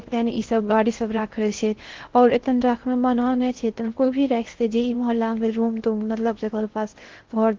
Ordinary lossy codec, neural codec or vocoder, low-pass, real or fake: Opus, 16 kbps; codec, 16 kHz in and 24 kHz out, 0.6 kbps, FocalCodec, streaming, 4096 codes; 7.2 kHz; fake